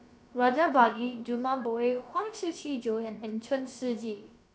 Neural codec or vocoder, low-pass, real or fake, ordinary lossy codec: codec, 16 kHz, about 1 kbps, DyCAST, with the encoder's durations; none; fake; none